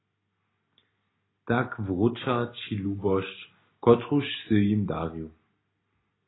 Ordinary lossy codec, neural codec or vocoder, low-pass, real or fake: AAC, 16 kbps; none; 7.2 kHz; real